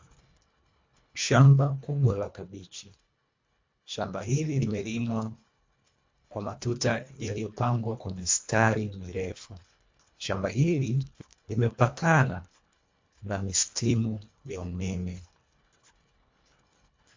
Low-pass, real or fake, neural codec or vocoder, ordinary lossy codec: 7.2 kHz; fake; codec, 24 kHz, 1.5 kbps, HILCodec; MP3, 48 kbps